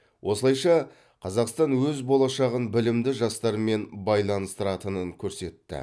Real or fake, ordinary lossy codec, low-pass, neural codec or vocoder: real; none; none; none